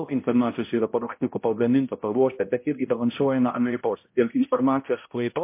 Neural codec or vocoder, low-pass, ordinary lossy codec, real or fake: codec, 16 kHz, 0.5 kbps, X-Codec, HuBERT features, trained on balanced general audio; 3.6 kHz; MP3, 24 kbps; fake